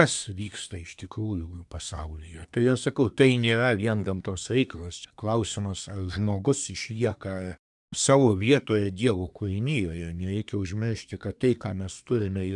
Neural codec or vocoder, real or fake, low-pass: codec, 24 kHz, 1 kbps, SNAC; fake; 10.8 kHz